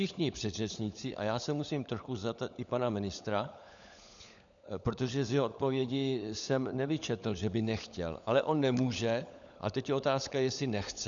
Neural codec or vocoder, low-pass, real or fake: codec, 16 kHz, 16 kbps, FunCodec, trained on LibriTTS, 50 frames a second; 7.2 kHz; fake